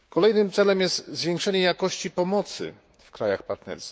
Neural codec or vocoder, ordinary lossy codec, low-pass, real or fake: codec, 16 kHz, 6 kbps, DAC; none; none; fake